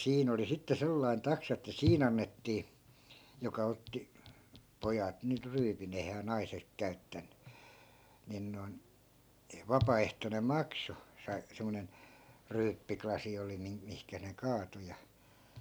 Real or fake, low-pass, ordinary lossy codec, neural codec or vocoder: real; none; none; none